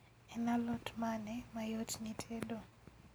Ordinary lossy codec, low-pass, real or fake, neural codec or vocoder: none; none; real; none